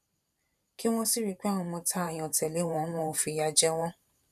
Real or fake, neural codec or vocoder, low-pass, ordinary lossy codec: fake; vocoder, 44.1 kHz, 128 mel bands every 512 samples, BigVGAN v2; 14.4 kHz; none